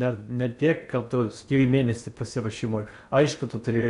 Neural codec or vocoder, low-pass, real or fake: codec, 16 kHz in and 24 kHz out, 0.8 kbps, FocalCodec, streaming, 65536 codes; 10.8 kHz; fake